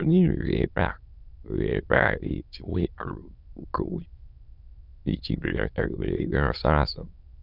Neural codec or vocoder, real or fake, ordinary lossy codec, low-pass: autoencoder, 22.05 kHz, a latent of 192 numbers a frame, VITS, trained on many speakers; fake; none; 5.4 kHz